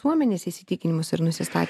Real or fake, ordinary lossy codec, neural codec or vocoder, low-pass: real; MP3, 96 kbps; none; 14.4 kHz